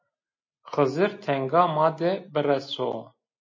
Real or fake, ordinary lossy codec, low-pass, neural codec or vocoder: real; MP3, 32 kbps; 7.2 kHz; none